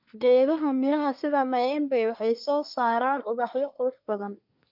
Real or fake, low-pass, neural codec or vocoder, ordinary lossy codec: fake; 5.4 kHz; codec, 24 kHz, 1 kbps, SNAC; none